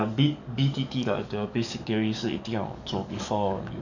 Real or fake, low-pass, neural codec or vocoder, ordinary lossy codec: fake; 7.2 kHz; codec, 44.1 kHz, 7.8 kbps, DAC; none